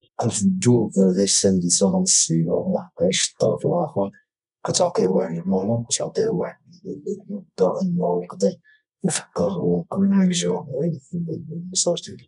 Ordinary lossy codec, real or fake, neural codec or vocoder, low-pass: none; fake; codec, 24 kHz, 0.9 kbps, WavTokenizer, medium music audio release; 10.8 kHz